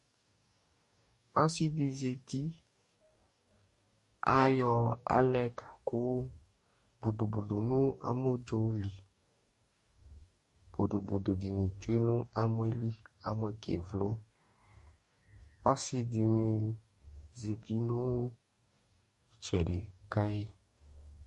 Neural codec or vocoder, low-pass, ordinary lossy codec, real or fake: codec, 44.1 kHz, 2.6 kbps, DAC; 14.4 kHz; MP3, 48 kbps; fake